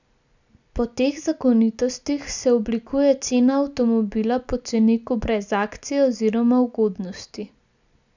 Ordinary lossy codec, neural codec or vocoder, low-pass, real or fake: none; none; 7.2 kHz; real